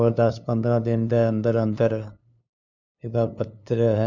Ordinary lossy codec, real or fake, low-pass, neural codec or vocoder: none; fake; 7.2 kHz; codec, 16 kHz, 2 kbps, FunCodec, trained on LibriTTS, 25 frames a second